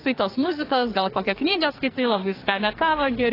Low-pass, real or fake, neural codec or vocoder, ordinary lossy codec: 5.4 kHz; fake; codec, 44.1 kHz, 2.6 kbps, SNAC; AAC, 24 kbps